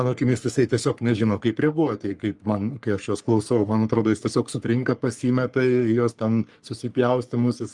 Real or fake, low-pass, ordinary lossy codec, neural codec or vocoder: fake; 10.8 kHz; Opus, 32 kbps; codec, 44.1 kHz, 3.4 kbps, Pupu-Codec